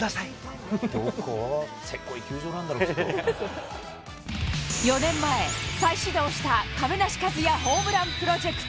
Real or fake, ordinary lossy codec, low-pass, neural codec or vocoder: real; none; none; none